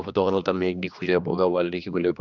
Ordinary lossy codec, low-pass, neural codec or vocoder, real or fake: none; 7.2 kHz; codec, 16 kHz, 2 kbps, X-Codec, HuBERT features, trained on general audio; fake